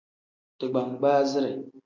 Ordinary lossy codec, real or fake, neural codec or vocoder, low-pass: MP3, 64 kbps; real; none; 7.2 kHz